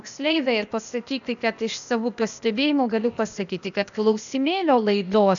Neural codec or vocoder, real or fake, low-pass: codec, 16 kHz, 0.8 kbps, ZipCodec; fake; 7.2 kHz